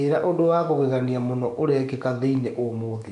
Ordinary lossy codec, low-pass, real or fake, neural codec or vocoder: none; 10.8 kHz; fake; codec, 44.1 kHz, 7.8 kbps, DAC